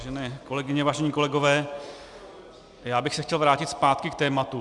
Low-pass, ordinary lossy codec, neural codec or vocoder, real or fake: 10.8 kHz; Opus, 64 kbps; none; real